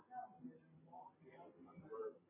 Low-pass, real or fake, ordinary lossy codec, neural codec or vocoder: 3.6 kHz; real; MP3, 16 kbps; none